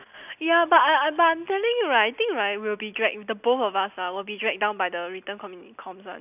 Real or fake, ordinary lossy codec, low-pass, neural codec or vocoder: real; none; 3.6 kHz; none